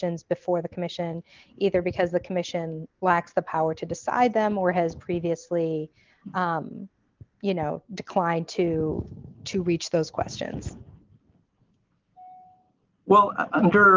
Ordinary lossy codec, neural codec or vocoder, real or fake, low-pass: Opus, 24 kbps; none; real; 7.2 kHz